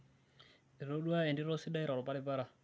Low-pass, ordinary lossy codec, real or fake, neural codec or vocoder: none; none; real; none